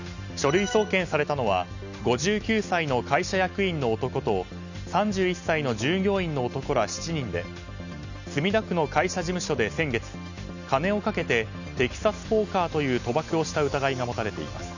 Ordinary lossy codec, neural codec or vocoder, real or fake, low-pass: none; none; real; 7.2 kHz